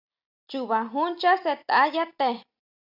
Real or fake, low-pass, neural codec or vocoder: real; 5.4 kHz; none